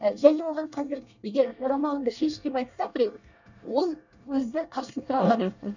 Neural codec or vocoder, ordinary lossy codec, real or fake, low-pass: codec, 24 kHz, 1 kbps, SNAC; none; fake; 7.2 kHz